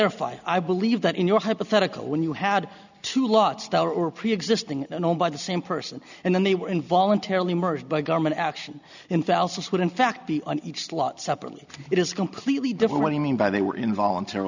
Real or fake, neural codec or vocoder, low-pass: real; none; 7.2 kHz